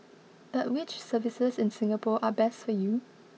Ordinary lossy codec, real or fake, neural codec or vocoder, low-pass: none; real; none; none